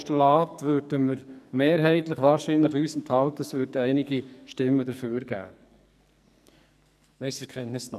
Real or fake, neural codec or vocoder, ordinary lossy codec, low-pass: fake; codec, 44.1 kHz, 2.6 kbps, SNAC; none; 14.4 kHz